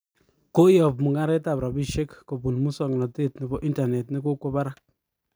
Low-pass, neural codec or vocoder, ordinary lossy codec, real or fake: none; none; none; real